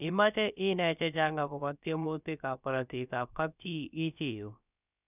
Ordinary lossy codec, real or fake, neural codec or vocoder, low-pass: none; fake; codec, 16 kHz, about 1 kbps, DyCAST, with the encoder's durations; 3.6 kHz